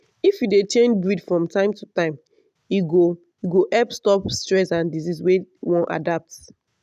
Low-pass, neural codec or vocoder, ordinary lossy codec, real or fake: 14.4 kHz; none; none; real